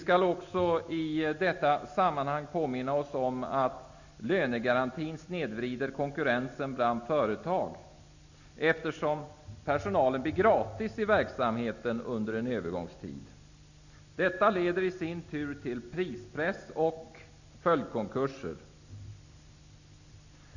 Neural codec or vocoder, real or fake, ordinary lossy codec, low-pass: none; real; none; 7.2 kHz